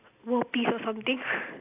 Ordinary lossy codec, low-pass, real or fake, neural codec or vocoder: AAC, 32 kbps; 3.6 kHz; real; none